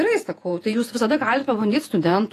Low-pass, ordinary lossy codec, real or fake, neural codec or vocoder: 14.4 kHz; AAC, 48 kbps; fake; vocoder, 48 kHz, 128 mel bands, Vocos